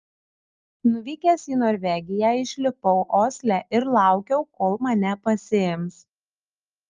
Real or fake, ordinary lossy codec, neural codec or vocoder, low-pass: real; Opus, 32 kbps; none; 7.2 kHz